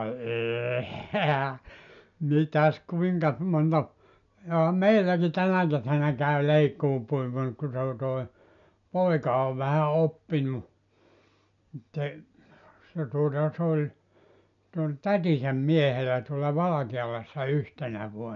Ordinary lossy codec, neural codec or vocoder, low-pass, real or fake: none; none; 7.2 kHz; real